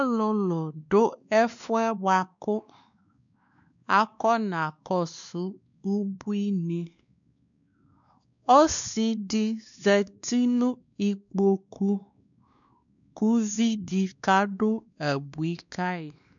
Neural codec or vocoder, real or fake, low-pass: codec, 16 kHz, 2 kbps, X-Codec, WavLM features, trained on Multilingual LibriSpeech; fake; 7.2 kHz